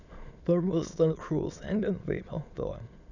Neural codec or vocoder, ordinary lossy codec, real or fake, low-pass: autoencoder, 22.05 kHz, a latent of 192 numbers a frame, VITS, trained on many speakers; none; fake; 7.2 kHz